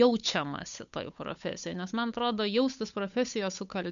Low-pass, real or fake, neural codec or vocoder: 7.2 kHz; fake; codec, 16 kHz, 8 kbps, FunCodec, trained on Chinese and English, 25 frames a second